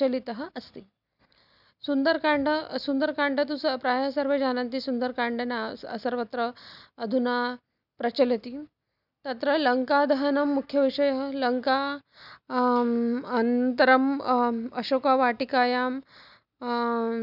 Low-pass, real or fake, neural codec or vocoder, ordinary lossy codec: 5.4 kHz; real; none; none